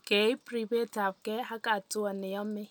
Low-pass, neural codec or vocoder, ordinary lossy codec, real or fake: none; none; none; real